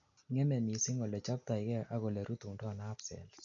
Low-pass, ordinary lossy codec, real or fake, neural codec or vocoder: 7.2 kHz; none; real; none